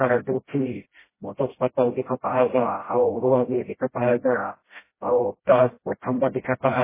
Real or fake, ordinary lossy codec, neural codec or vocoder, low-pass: fake; MP3, 16 kbps; codec, 16 kHz, 0.5 kbps, FreqCodec, smaller model; 3.6 kHz